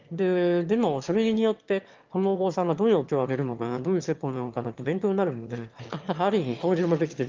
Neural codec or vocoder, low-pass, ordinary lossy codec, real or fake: autoencoder, 22.05 kHz, a latent of 192 numbers a frame, VITS, trained on one speaker; 7.2 kHz; Opus, 32 kbps; fake